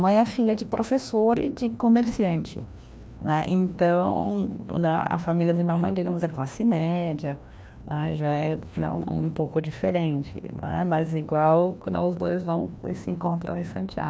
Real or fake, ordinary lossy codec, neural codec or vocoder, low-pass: fake; none; codec, 16 kHz, 1 kbps, FreqCodec, larger model; none